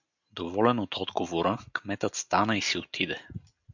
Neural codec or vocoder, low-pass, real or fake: none; 7.2 kHz; real